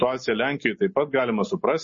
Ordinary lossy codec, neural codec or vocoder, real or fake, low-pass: MP3, 32 kbps; none; real; 7.2 kHz